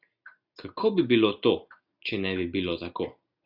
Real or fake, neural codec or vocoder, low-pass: real; none; 5.4 kHz